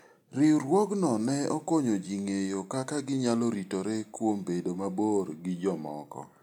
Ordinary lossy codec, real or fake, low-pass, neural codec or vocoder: none; real; 19.8 kHz; none